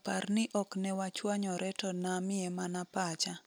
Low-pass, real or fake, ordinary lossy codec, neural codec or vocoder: none; real; none; none